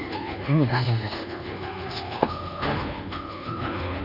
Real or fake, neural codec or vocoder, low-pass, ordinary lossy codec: fake; codec, 24 kHz, 1.2 kbps, DualCodec; 5.4 kHz; none